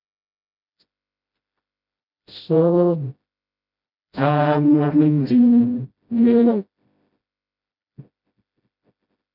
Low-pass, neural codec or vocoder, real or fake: 5.4 kHz; codec, 16 kHz, 0.5 kbps, FreqCodec, smaller model; fake